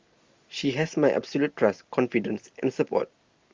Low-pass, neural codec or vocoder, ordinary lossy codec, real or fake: 7.2 kHz; none; Opus, 32 kbps; real